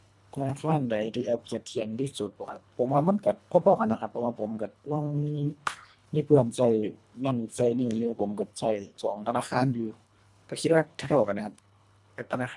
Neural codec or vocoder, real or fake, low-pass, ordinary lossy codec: codec, 24 kHz, 1.5 kbps, HILCodec; fake; none; none